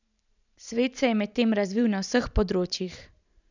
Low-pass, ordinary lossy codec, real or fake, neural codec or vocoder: 7.2 kHz; none; fake; vocoder, 44.1 kHz, 128 mel bands every 512 samples, BigVGAN v2